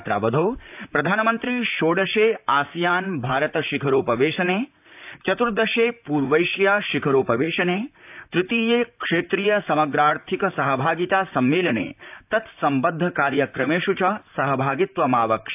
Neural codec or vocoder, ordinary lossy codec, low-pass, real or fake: vocoder, 44.1 kHz, 128 mel bands, Pupu-Vocoder; AAC, 32 kbps; 3.6 kHz; fake